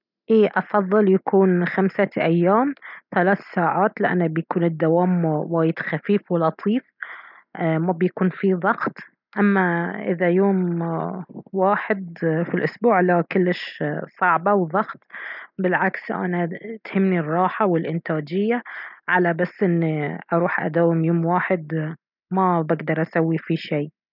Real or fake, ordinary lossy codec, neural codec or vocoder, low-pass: real; none; none; 5.4 kHz